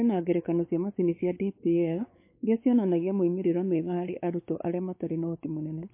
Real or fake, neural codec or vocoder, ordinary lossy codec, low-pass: fake; codec, 16 kHz, 4 kbps, X-Codec, WavLM features, trained on Multilingual LibriSpeech; MP3, 24 kbps; 3.6 kHz